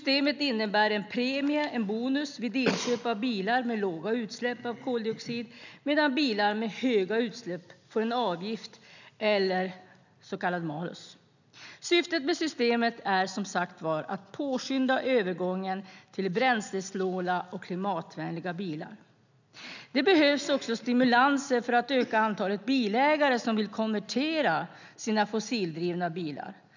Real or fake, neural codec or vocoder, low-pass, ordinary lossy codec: real; none; 7.2 kHz; none